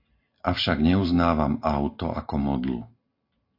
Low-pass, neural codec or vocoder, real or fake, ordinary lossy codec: 5.4 kHz; none; real; AAC, 32 kbps